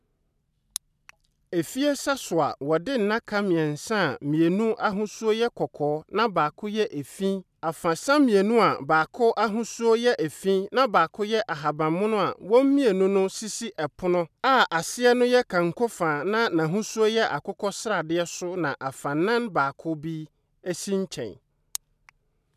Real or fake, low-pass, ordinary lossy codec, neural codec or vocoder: real; 14.4 kHz; none; none